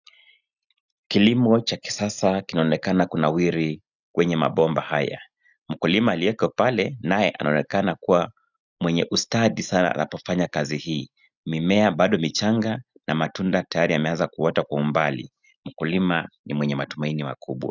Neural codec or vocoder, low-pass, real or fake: none; 7.2 kHz; real